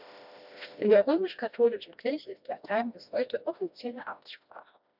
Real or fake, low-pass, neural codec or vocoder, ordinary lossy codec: fake; 5.4 kHz; codec, 16 kHz, 1 kbps, FreqCodec, smaller model; none